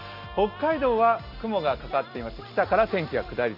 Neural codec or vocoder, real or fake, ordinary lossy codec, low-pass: none; real; AAC, 48 kbps; 5.4 kHz